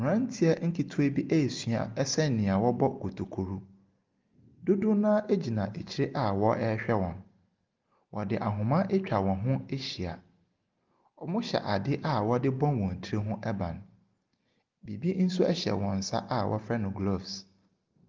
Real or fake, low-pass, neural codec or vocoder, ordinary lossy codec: real; 7.2 kHz; none; Opus, 32 kbps